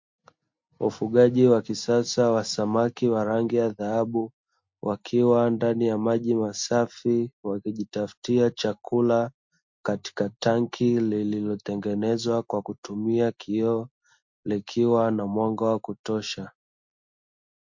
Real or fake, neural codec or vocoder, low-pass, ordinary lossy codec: real; none; 7.2 kHz; MP3, 48 kbps